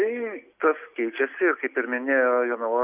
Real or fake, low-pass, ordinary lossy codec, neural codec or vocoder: real; 3.6 kHz; Opus, 64 kbps; none